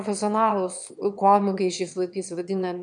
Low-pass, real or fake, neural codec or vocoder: 9.9 kHz; fake; autoencoder, 22.05 kHz, a latent of 192 numbers a frame, VITS, trained on one speaker